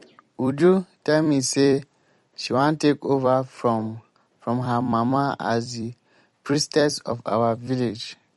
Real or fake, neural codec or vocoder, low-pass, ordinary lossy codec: fake; vocoder, 44.1 kHz, 128 mel bands every 256 samples, BigVGAN v2; 19.8 kHz; MP3, 48 kbps